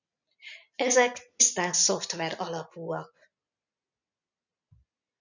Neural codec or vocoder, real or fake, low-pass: none; real; 7.2 kHz